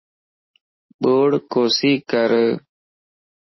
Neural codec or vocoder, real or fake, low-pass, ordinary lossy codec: none; real; 7.2 kHz; MP3, 24 kbps